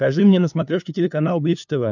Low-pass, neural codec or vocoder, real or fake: 7.2 kHz; codec, 16 kHz, 2 kbps, FunCodec, trained on LibriTTS, 25 frames a second; fake